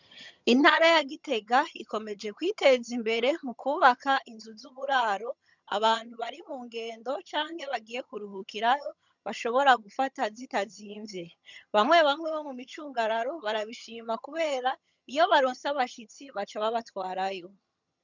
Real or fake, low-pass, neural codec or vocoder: fake; 7.2 kHz; vocoder, 22.05 kHz, 80 mel bands, HiFi-GAN